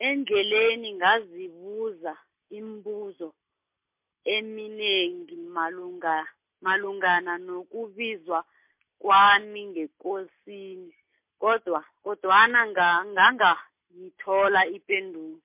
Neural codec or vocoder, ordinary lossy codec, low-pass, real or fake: none; MP3, 32 kbps; 3.6 kHz; real